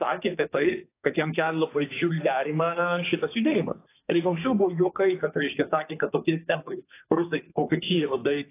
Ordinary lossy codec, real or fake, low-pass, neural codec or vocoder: AAC, 24 kbps; fake; 3.6 kHz; codec, 32 kHz, 1.9 kbps, SNAC